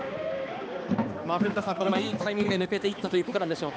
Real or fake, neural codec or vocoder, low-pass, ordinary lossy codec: fake; codec, 16 kHz, 2 kbps, X-Codec, HuBERT features, trained on balanced general audio; none; none